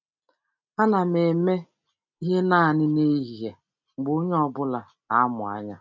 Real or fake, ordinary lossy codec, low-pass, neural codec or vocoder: real; none; 7.2 kHz; none